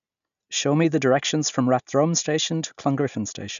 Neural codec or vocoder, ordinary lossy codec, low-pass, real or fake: none; none; 7.2 kHz; real